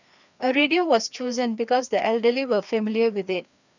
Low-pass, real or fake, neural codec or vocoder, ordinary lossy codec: 7.2 kHz; fake; codec, 16 kHz, 2 kbps, FreqCodec, larger model; none